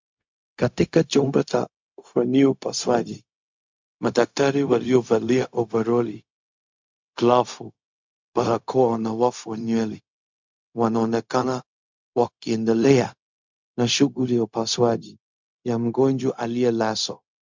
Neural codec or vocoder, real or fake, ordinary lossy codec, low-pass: codec, 16 kHz, 0.4 kbps, LongCat-Audio-Codec; fake; MP3, 64 kbps; 7.2 kHz